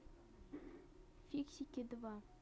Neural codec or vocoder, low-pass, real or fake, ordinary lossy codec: none; none; real; none